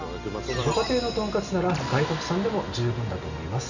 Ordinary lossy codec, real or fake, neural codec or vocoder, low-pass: none; real; none; 7.2 kHz